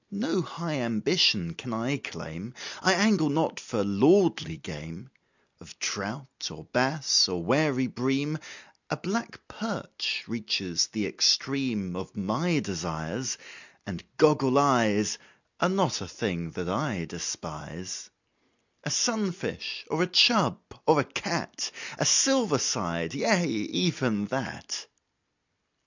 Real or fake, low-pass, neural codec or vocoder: real; 7.2 kHz; none